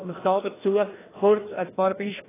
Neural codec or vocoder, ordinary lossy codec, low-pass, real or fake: codec, 16 kHz, 1 kbps, FreqCodec, larger model; AAC, 16 kbps; 3.6 kHz; fake